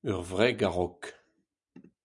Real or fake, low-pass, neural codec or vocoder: real; 10.8 kHz; none